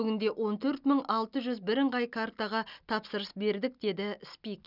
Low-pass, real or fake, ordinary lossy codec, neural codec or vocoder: 5.4 kHz; real; none; none